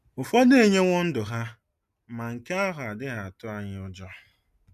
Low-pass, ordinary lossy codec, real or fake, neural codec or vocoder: 14.4 kHz; MP3, 96 kbps; real; none